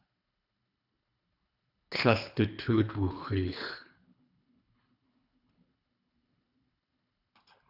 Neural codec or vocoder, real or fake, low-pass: codec, 24 kHz, 3 kbps, HILCodec; fake; 5.4 kHz